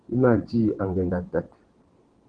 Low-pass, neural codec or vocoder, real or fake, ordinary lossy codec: 9.9 kHz; vocoder, 22.05 kHz, 80 mel bands, WaveNeXt; fake; Opus, 16 kbps